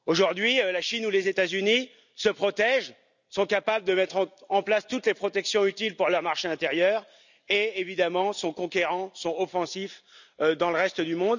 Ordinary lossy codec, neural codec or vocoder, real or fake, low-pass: none; none; real; 7.2 kHz